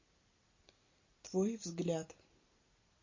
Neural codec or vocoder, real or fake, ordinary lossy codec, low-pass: none; real; MP3, 32 kbps; 7.2 kHz